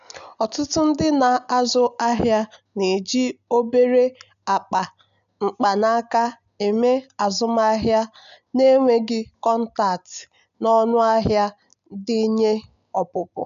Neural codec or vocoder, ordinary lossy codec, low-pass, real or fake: none; none; 7.2 kHz; real